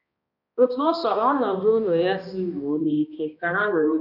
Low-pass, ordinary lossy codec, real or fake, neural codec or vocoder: 5.4 kHz; none; fake; codec, 16 kHz, 1 kbps, X-Codec, HuBERT features, trained on balanced general audio